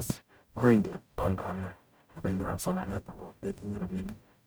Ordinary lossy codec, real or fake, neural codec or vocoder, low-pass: none; fake; codec, 44.1 kHz, 0.9 kbps, DAC; none